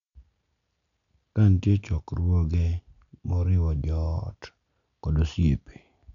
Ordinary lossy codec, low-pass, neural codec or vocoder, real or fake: none; 7.2 kHz; none; real